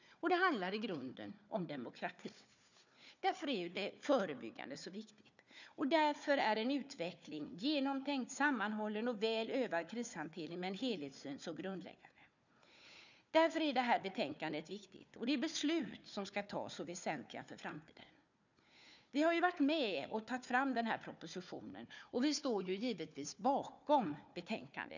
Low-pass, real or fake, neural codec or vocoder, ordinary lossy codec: 7.2 kHz; fake; codec, 16 kHz, 4 kbps, FunCodec, trained on Chinese and English, 50 frames a second; none